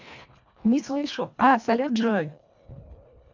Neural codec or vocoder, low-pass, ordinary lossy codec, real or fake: codec, 24 kHz, 1.5 kbps, HILCodec; 7.2 kHz; MP3, 64 kbps; fake